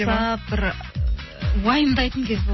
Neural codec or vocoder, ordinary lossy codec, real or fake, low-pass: none; MP3, 24 kbps; real; 7.2 kHz